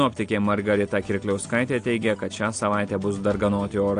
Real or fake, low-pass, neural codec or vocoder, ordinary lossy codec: real; 9.9 kHz; none; AAC, 48 kbps